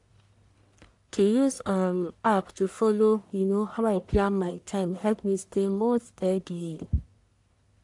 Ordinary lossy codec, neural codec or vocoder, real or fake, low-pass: AAC, 64 kbps; codec, 44.1 kHz, 1.7 kbps, Pupu-Codec; fake; 10.8 kHz